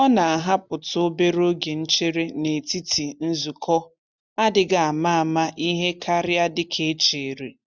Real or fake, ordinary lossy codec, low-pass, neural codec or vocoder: real; none; none; none